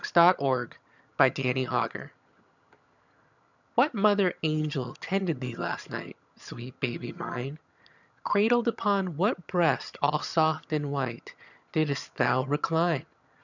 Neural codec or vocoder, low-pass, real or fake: vocoder, 22.05 kHz, 80 mel bands, HiFi-GAN; 7.2 kHz; fake